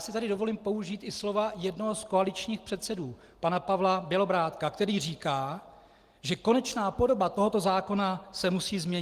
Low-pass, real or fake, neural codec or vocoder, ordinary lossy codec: 14.4 kHz; real; none; Opus, 24 kbps